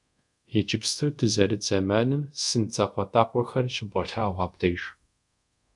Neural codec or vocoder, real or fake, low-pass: codec, 24 kHz, 0.5 kbps, DualCodec; fake; 10.8 kHz